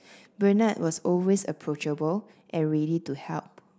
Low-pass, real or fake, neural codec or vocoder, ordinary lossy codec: none; real; none; none